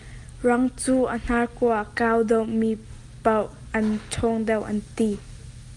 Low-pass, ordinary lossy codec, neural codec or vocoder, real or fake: 10.8 kHz; Opus, 32 kbps; none; real